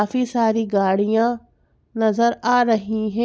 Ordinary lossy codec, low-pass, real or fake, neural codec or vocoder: none; none; real; none